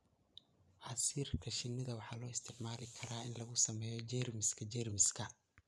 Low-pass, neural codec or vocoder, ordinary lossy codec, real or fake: none; vocoder, 24 kHz, 100 mel bands, Vocos; none; fake